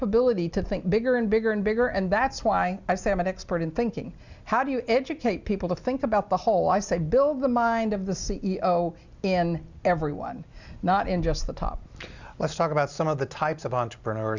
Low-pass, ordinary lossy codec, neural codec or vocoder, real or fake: 7.2 kHz; Opus, 64 kbps; none; real